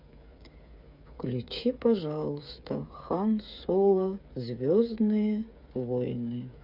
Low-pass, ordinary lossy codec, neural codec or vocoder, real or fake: 5.4 kHz; MP3, 48 kbps; codec, 16 kHz, 8 kbps, FreqCodec, smaller model; fake